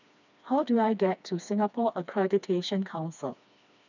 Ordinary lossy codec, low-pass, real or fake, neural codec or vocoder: none; 7.2 kHz; fake; codec, 16 kHz, 2 kbps, FreqCodec, smaller model